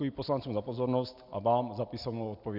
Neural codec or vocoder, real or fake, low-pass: none; real; 5.4 kHz